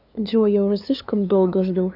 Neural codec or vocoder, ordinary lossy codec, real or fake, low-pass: codec, 16 kHz, 2 kbps, FunCodec, trained on LibriTTS, 25 frames a second; none; fake; 5.4 kHz